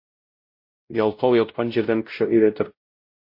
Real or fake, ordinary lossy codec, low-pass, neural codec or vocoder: fake; MP3, 32 kbps; 5.4 kHz; codec, 16 kHz, 0.5 kbps, X-Codec, WavLM features, trained on Multilingual LibriSpeech